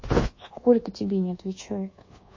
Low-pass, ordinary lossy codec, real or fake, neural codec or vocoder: 7.2 kHz; MP3, 32 kbps; fake; codec, 24 kHz, 1.2 kbps, DualCodec